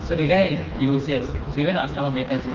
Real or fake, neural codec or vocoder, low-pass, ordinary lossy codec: fake; codec, 16 kHz, 2 kbps, FreqCodec, smaller model; 7.2 kHz; Opus, 32 kbps